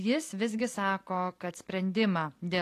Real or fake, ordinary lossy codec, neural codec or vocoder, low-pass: fake; AAC, 48 kbps; vocoder, 44.1 kHz, 128 mel bands every 256 samples, BigVGAN v2; 14.4 kHz